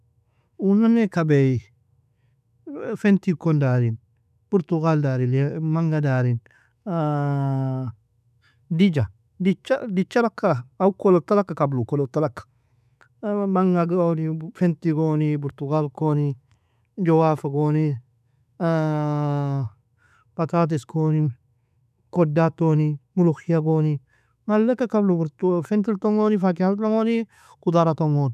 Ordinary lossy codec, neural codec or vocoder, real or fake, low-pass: none; autoencoder, 48 kHz, 128 numbers a frame, DAC-VAE, trained on Japanese speech; fake; 14.4 kHz